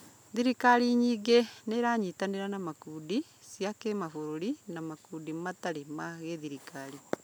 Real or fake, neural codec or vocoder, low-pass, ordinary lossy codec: real; none; none; none